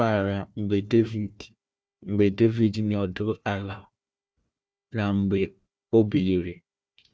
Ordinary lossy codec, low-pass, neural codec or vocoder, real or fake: none; none; codec, 16 kHz, 1 kbps, FunCodec, trained on Chinese and English, 50 frames a second; fake